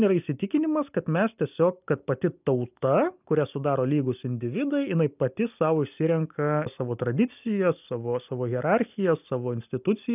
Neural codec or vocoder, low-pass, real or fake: none; 3.6 kHz; real